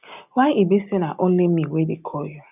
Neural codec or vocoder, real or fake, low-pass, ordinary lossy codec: none; real; 3.6 kHz; none